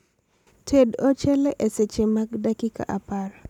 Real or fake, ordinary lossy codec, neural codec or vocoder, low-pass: real; none; none; 19.8 kHz